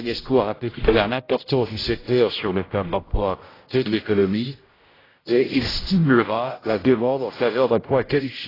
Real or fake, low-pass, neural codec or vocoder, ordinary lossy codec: fake; 5.4 kHz; codec, 16 kHz, 0.5 kbps, X-Codec, HuBERT features, trained on general audio; AAC, 24 kbps